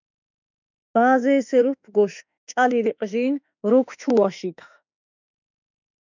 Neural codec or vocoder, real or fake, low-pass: autoencoder, 48 kHz, 32 numbers a frame, DAC-VAE, trained on Japanese speech; fake; 7.2 kHz